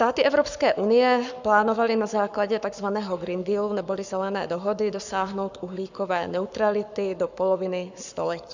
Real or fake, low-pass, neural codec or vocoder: fake; 7.2 kHz; codec, 44.1 kHz, 7.8 kbps, Pupu-Codec